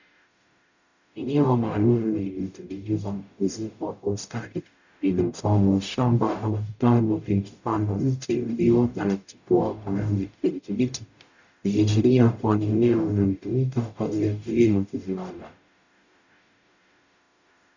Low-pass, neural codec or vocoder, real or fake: 7.2 kHz; codec, 44.1 kHz, 0.9 kbps, DAC; fake